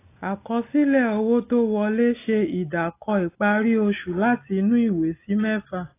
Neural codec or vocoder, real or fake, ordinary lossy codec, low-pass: none; real; AAC, 24 kbps; 3.6 kHz